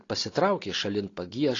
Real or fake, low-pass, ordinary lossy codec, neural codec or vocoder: real; 7.2 kHz; AAC, 32 kbps; none